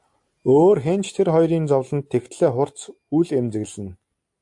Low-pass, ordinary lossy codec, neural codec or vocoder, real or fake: 10.8 kHz; AAC, 64 kbps; none; real